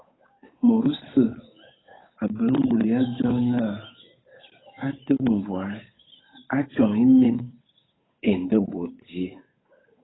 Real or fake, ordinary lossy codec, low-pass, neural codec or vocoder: fake; AAC, 16 kbps; 7.2 kHz; codec, 16 kHz, 8 kbps, FunCodec, trained on Chinese and English, 25 frames a second